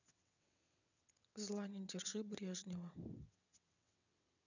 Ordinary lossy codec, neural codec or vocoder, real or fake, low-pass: none; vocoder, 22.05 kHz, 80 mel bands, WaveNeXt; fake; 7.2 kHz